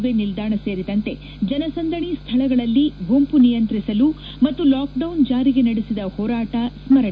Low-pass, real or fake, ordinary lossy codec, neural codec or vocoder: none; real; none; none